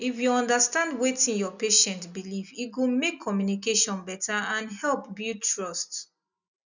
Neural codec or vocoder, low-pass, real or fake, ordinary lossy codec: none; 7.2 kHz; real; none